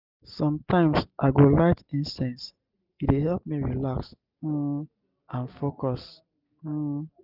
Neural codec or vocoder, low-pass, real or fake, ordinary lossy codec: none; 5.4 kHz; real; none